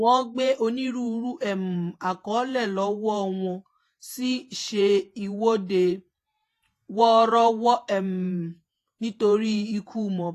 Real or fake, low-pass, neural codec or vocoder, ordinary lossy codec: fake; 14.4 kHz; vocoder, 48 kHz, 128 mel bands, Vocos; AAC, 48 kbps